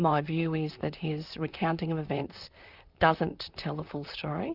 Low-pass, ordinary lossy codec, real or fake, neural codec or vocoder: 5.4 kHz; Opus, 64 kbps; fake; vocoder, 44.1 kHz, 128 mel bands, Pupu-Vocoder